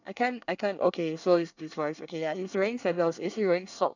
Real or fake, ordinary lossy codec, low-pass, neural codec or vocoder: fake; none; 7.2 kHz; codec, 24 kHz, 1 kbps, SNAC